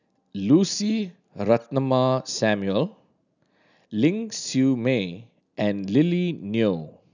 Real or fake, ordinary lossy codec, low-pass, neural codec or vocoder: real; none; 7.2 kHz; none